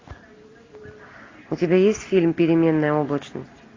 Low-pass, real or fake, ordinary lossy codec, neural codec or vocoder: 7.2 kHz; real; AAC, 32 kbps; none